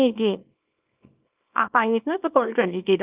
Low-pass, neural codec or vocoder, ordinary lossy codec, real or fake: 3.6 kHz; codec, 24 kHz, 0.9 kbps, WavTokenizer, small release; Opus, 24 kbps; fake